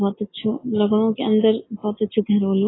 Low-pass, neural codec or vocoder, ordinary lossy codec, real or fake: 7.2 kHz; none; AAC, 16 kbps; real